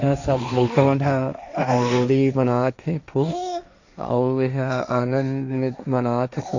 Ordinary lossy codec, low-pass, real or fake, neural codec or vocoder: none; 7.2 kHz; fake; codec, 16 kHz, 1.1 kbps, Voila-Tokenizer